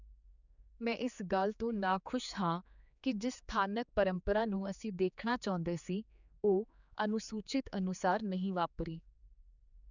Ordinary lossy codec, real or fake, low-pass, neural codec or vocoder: none; fake; 7.2 kHz; codec, 16 kHz, 4 kbps, X-Codec, HuBERT features, trained on general audio